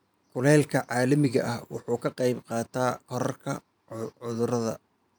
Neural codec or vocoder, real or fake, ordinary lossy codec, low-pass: vocoder, 44.1 kHz, 128 mel bands every 512 samples, BigVGAN v2; fake; none; none